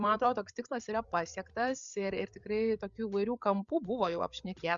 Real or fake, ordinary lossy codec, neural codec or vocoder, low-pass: fake; Opus, 64 kbps; codec, 16 kHz, 16 kbps, FreqCodec, larger model; 7.2 kHz